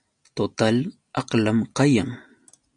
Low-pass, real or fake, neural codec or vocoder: 9.9 kHz; real; none